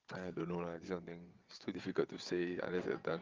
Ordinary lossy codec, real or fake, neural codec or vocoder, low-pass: Opus, 24 kbps; fake; vocoder, 22.05 kHz, 80 mel bands, WaveNeXt; 7.2 kHz